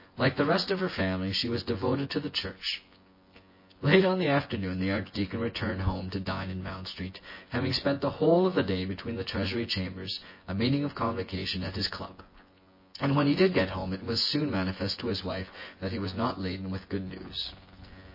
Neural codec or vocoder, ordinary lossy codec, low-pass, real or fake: vocoder, 24 kHz, 100 mel bands, Vocos; MP3, 24 kbps; 5.4 kHz; fake